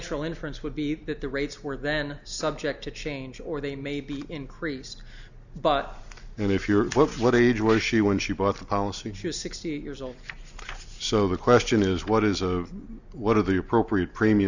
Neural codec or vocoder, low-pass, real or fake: none; 7.2 kHz; real